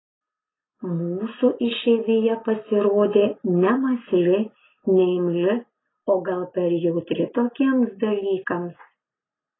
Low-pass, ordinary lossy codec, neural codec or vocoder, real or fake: 7.2 kHz; AAC, 16 kbps; none; real